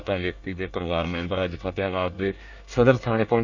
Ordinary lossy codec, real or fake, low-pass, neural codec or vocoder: none; fake; 7.2 kHz; codec, 24 kHz, 1 kbps, SNAC